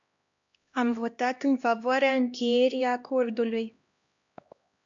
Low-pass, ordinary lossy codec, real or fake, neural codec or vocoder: 7.2 kHz; AAC, 64 kbps; fake; codec, 16 kHz, 1 kbps, X-Codec, HuBERT features, trained on LibriSpeech